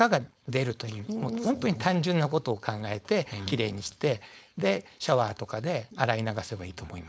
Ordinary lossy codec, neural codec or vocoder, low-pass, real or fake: none; codec, 16 kHz, 4.8 kbps, FACodec; none; fake